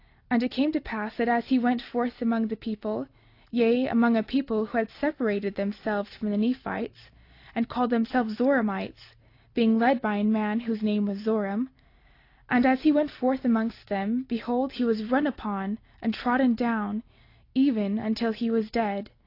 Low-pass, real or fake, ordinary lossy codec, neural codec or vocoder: 5.4 kHz; real; AAC, 32 kbps; none